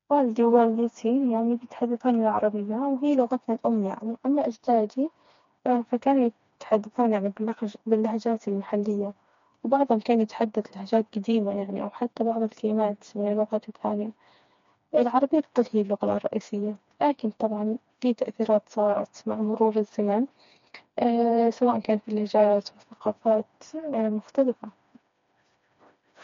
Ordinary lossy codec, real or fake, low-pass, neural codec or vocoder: MP3, 48 kbps; fake; 7.2 kHz; codec, 16 kHz, 2 kbps, FreqCodec, smaller model